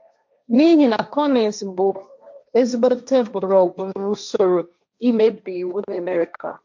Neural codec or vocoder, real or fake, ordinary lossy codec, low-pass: codec, 16 kHz, 1.1 kbps, Voila-Tokenizer; fake; MP3, 64 kbps; 7.2 kHz